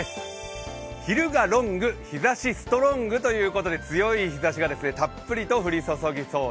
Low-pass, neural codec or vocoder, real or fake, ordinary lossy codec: none; none; real; none